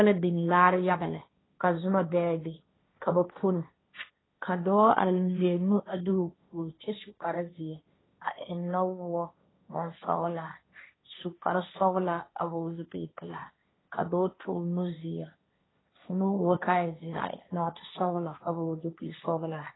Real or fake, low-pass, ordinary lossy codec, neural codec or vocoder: fake; 7.2 kHz; AAC, 16 kbps; codec, 16 kHz, 1.1 kbps, Voila-Tokenizer